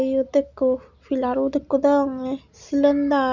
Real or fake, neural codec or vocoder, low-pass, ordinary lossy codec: real; none; 7.2 kHz; none